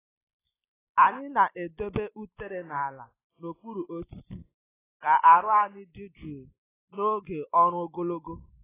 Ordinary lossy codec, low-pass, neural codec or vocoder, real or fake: AAC, 16 kbps; 3.6 kHz; none; real